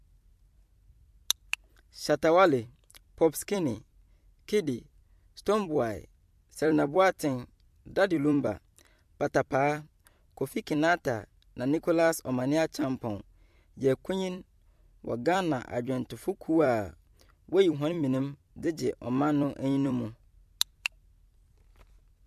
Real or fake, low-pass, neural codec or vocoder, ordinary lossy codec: fake; 14.4 kHz; vocoder, 44.1 kHz, 128 mel bands every 256 samples, BigVGAN v2; MP3, 64 kbps